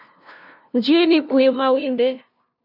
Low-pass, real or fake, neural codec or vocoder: 5.4 kHz; fake; codec, 16 kHz, 0.5 kbps, FunCodec, trained on LibriTTS, 25 frames a second